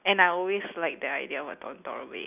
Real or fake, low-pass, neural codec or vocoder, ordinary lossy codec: real; 3.6 kHz; none; none